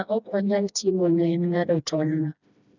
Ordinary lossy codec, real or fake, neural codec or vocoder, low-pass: none; fake; codec, 16 kHz, 1 kbps, FreqCodec, smaller model; 7.2 kHz